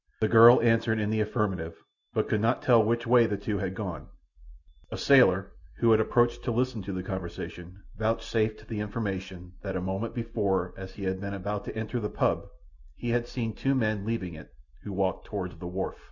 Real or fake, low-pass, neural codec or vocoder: real; 7.2 kHz; none